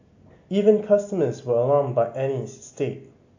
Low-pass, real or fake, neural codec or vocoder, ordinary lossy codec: 7.2 kHz; real; none; AAC, 48 kbps